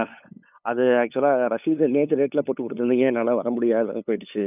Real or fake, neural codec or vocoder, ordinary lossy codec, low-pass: fake; codec, 16 kHz, 8 kbps, FunCodec, trained on LibriTTS, 25 frames a second; none; 3.6 kHz